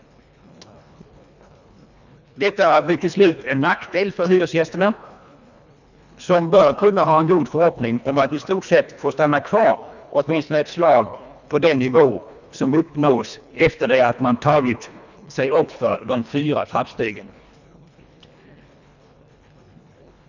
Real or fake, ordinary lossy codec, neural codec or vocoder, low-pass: fake; none; codec, 24 kHz, 1.5 kbps, HILCodec; 7.2 kHz